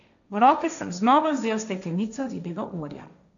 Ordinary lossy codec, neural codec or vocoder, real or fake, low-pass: none; codec, 16 kHz, 1.1 kbps, Voila-Tokenizer; fake; 7.2 kHz